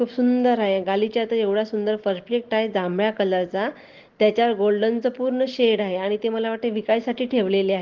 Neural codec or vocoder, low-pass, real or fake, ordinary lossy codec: none; 7.2 kHz; real; Opus, 16 kbps